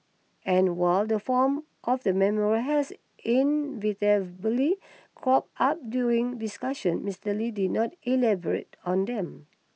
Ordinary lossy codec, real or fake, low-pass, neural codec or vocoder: none; real; none; none